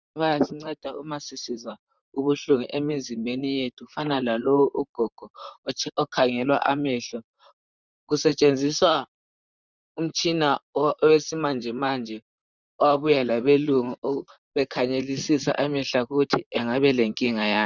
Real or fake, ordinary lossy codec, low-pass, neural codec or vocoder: fake; Opus, 64 kbps; 7.2 kHz; vocoder, 44.1 kHz, 128 mel bands, Pupu-Vocoder